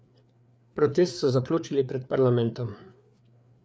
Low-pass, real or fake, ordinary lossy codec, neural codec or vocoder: none; fake; none; codec, 16 kHz, 4 kbps, FreqCodec, larger model